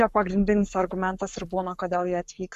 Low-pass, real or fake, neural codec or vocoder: 14.4 kHz; fake; codec, 44.1 kHz, 7.8 kbps, Pupu-Codec